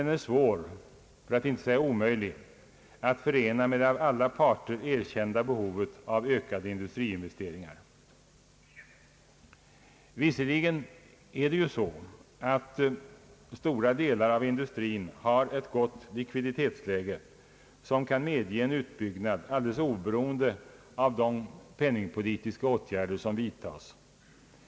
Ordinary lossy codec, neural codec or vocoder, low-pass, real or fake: none; none; none; real